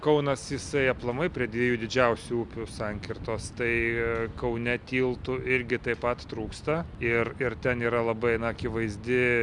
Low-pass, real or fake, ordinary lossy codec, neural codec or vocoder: 10.8 kHz; real; Opus, 64 kbps; none